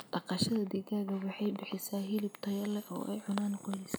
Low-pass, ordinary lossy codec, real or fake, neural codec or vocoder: none; none; real; none